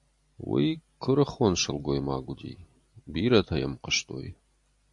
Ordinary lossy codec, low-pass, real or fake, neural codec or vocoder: AAC, 64 kbps; 10.8 kHz; real; none